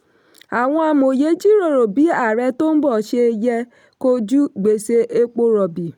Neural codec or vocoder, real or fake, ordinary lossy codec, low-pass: none; real; none; 19.8 kHz